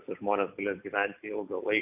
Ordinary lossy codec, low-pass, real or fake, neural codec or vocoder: AAC, 32 kbps; 3.6 kHz; real; none